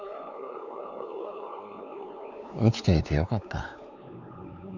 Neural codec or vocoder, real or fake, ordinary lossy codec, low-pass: codec, 16 kHz, 4 kbps, X-Codec, WavLM features, trained on Multilingual LibriSpeech; fake; none; 7.2 kHz